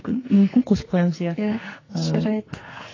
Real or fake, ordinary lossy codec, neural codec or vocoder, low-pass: fake; AAC, 32 kbps; codec, 44.1 kHz, 2.6 kbps, SNAC; 7.2 kHz